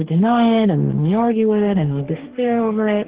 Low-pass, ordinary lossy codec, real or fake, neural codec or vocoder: 3.6 kHz; Opus, 16 kbps; fake; codec, 44.1 kHz, 2.6 kbps, DAC